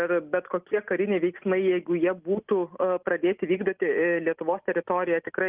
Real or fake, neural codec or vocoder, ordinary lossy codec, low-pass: real; none; Opus, 24 kbps; 3.6 kHz